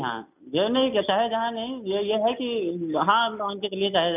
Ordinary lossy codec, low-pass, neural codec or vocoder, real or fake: none; 3.6 kHz; none; real